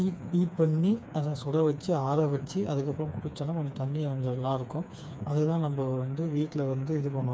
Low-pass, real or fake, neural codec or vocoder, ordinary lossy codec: none; fake; codec, 16 kHz, 4 kbps, FreqCodec, smaller model; none